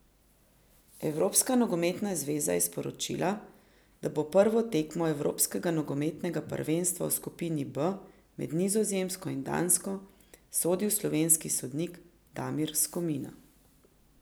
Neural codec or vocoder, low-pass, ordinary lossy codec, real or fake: none; none; none; real